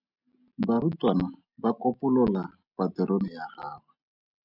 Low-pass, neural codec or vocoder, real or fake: 5.4 kHz; none; real